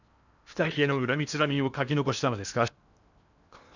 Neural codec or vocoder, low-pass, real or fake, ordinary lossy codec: codec, 16 kHz in and 24 kHz out, 0.8 kbps, FocalCodec, streaming, 65536 codes; 7.2 kHz; fake; none